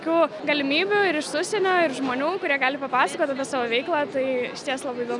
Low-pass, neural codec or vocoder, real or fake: 10.8 kHz; none; real